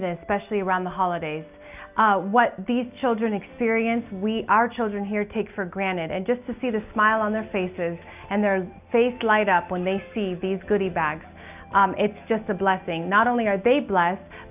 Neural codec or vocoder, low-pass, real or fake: none; 3.6 kHz; real